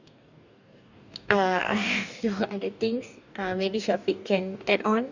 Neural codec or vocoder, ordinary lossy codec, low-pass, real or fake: codec, 44.1 kHz, 2.6 kbps, DAC; none; 7.2 kHz; fake